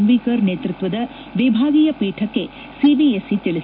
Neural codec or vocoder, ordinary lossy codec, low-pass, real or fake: none; none; 5.4 kHz; real